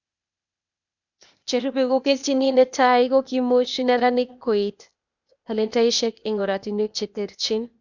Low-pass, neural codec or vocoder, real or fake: 7.2 kHz; codec, 16 kHz, 0.8 kbps, ZipCodec; fake